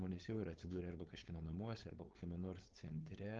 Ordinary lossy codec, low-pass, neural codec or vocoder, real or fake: Opus, 16 kbps; 7.2 kHz; codec, 16 kHz, 4.8 kbps, FACodec; fake